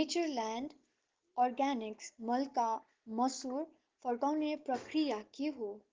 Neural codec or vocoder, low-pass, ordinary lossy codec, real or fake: none; 7.2 kHz; Opus, 16 kbps; real